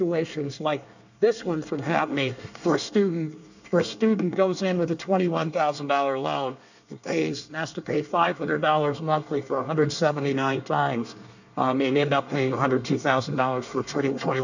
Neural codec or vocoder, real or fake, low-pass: codec, 24 kHz, 1 kbps, SNAC; fake; 7.2 kHz